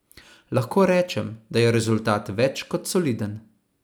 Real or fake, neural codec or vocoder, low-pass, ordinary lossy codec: real; none; none; none